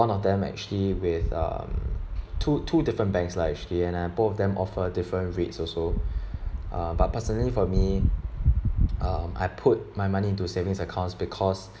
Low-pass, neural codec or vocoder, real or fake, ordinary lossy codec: none; none; real; none